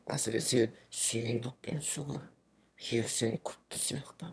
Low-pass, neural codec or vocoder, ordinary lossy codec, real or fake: none; autoencoder, 22.05 kHz, a latent of 192 numbers a frame, VITS, trained on one speaker; none; fake